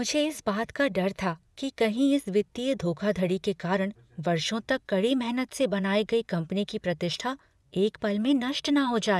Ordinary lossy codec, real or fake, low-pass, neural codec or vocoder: none; fake; none; vocoder, 24 kHz, 100 mel bands, Vocos